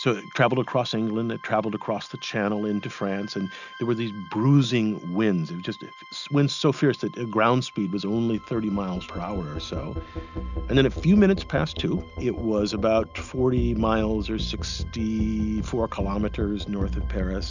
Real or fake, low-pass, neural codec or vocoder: real; 7.2 kHz; none